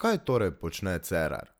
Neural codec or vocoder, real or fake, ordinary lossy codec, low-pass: none; real; none; none